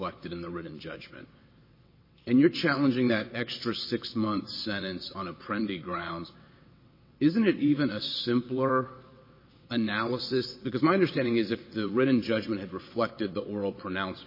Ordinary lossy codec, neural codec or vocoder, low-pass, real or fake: MP3, 24 kbps; vocoder, 22.05 kHz, 80 mel bands, WaveNeXt; 5.4 kHz; fake